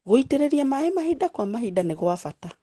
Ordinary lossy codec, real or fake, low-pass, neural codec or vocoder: Opus, 16 kbps; real; 10.8 kHz; none